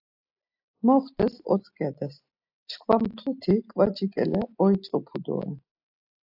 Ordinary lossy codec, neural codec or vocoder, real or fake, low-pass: AAC, 48 kbps; none; real; 5.4 kHz